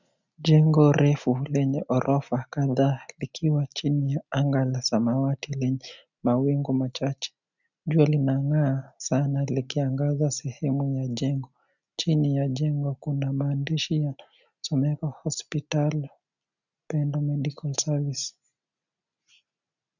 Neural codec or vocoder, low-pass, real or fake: none; 7.2 kHz; real